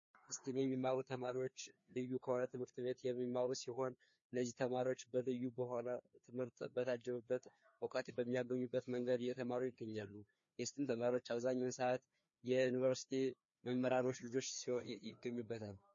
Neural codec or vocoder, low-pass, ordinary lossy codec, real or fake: codec, 16 kHz, 2 kbps, FreqCodec, larger model; 7.2 kHz; MP3, 32 kbps; fake